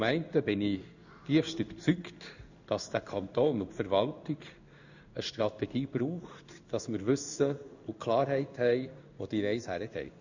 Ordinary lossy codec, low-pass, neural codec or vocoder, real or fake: none; 7.2 kHz; codec, 16 kHz in and 24 kHz out, 1 kbps, XY-Tokenizer; fake